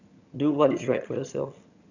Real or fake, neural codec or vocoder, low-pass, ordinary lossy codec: fake; vocoder, 22.05 kHz, 80 mel bands, HiFi-GAN; 7.2 kHz; none